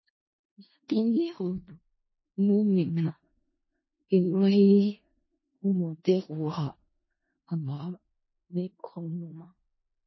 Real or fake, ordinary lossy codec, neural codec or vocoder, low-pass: fake; MP3, 24 kbps; codec, 16 kHz in and 24 kHz out, 0.4 kbps, LongCat-Audio-Codec, four codebook decoder; 7.2 kHz